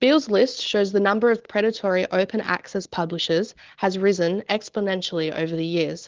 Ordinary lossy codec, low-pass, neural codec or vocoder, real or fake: Opus, 16 kbps; 7.2 kHz; none; real